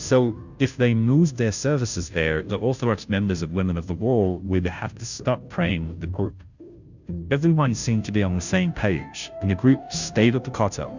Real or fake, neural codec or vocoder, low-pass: fake; codec, 16 kHz, 0.5 kbps, FunCodec, trained on Chinese and English, 25 frames a second; 7.2 kHz